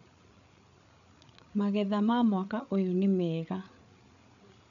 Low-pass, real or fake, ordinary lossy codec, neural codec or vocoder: 7.2 kHz; fake; none; codec, 16 kHz, 8 kbps, FreqCodec, larger model